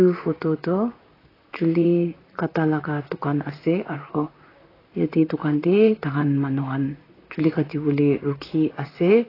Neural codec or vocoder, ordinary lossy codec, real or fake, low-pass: vocoder, 44.1 kHz, 128 mel bands, Pupu-Vocoder; AAC, 24 kbps; fake; 5.4 kHz